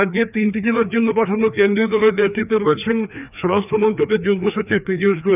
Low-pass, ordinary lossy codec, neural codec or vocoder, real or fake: 3.6 kHz; none; codec, 16 kHz, 2 kbps, FreqCodec, larger model; fake